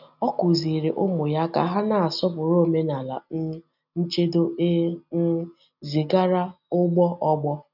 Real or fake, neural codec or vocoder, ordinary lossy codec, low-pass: real; none; none; 5.4 kHz